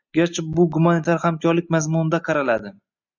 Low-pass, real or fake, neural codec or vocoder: 7.2 kHz; real; none